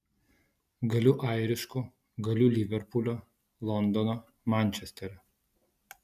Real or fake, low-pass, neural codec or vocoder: real; 14.4 kHz; none